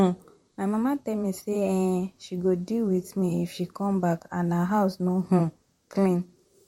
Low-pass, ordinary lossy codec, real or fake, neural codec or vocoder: 19.8 kHz; MP3, 64 kbps; fake; vocoder, 44.1 kHz, 128 mel bands, Pupu-Vocoder